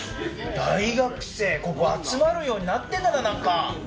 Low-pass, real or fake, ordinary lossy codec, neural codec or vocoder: none; real; none; none